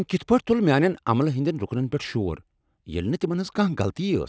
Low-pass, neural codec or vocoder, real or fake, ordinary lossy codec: none; none; real; none